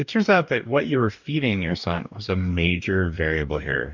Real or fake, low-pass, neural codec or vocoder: fake; 7.2 kHz; codec, 44.1 kHz, 2.6 kbps, DAC